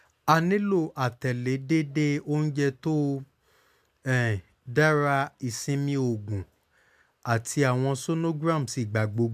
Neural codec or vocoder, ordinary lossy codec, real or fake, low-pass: none; MP3, 96 kbps; real; 14.4 kHz